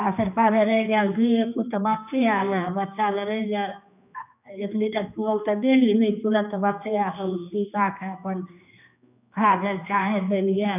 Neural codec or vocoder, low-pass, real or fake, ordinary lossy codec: codec, 16 kHz, 2 kbps, X-Codec, HuBERT features, trained on general audio; 3.6 kHz; fake; none